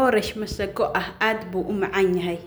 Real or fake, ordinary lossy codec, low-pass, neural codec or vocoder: real; none; none; none